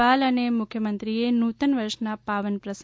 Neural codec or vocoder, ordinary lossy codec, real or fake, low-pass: none; none; real; none